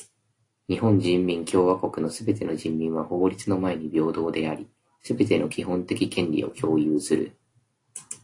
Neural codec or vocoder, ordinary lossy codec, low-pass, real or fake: none; AAC, 48 kbps; 10.8 kHz; real